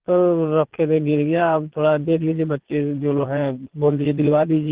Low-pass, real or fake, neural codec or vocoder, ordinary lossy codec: 3.6 kHz; fake; vocoder, 22.05 kHz, 80 mel bands, Vocos; Opus, 16 kbps